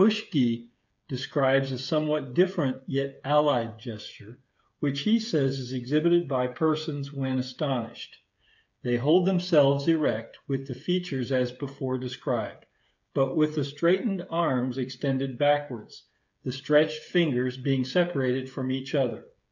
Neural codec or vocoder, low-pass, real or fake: codec, 16 kHz, 8 kbps, FreqCodec, smaller model; 7.2 kHz; fake